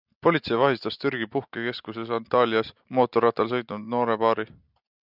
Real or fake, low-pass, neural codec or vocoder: real; 5.4 kHz; none